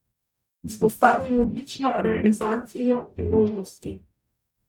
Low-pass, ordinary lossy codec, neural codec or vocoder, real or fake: 19.8 kHz; none; codec, 44.1 kHz, 0.9 kbps, DAC; fake